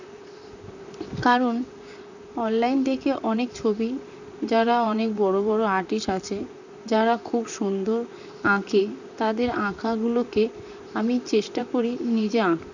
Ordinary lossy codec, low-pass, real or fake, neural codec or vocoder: none; 7.2 kHz; fake; vocoder, 44.1 kHz, 128 mel bands, Pupu-Vocoder